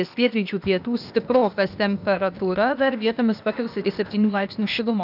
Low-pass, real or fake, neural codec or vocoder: 5.4 kHz; fake; codec, 16 kHz, 0.8 kbps, ZipCodec